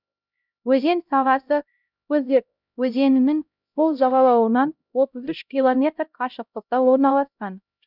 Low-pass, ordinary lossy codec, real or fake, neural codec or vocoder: 5.4 kHz; none; fake; codec, 16 kHz, 0.5 kbps, X-Codec, HuBERT features, trained on LibriSpeech